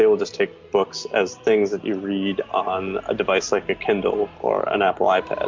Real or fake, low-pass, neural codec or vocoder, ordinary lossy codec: real; 7.2 kHz; none; MP3, 64 kbps